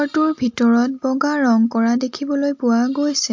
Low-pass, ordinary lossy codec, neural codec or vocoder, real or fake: 7.2 kHz; MP3, 48 kbps; none; real